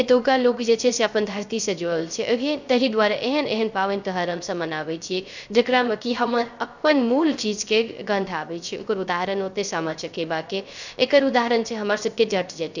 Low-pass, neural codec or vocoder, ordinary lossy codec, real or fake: 7.2 kHz; codec, 16 kHz, 0.3 kbps, FocalCodec; none; fake